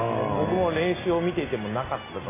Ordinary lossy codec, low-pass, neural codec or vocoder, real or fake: MP3, 16 kbps; 3.6 kHz; none; real